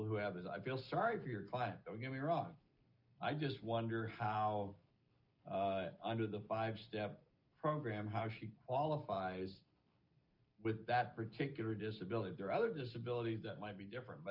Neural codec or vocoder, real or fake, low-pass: none; real; 5.4 kHz